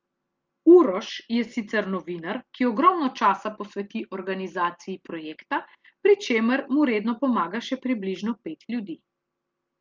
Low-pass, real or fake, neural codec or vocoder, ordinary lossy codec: 7.2 kHz; real; none; Opus, 32 kbps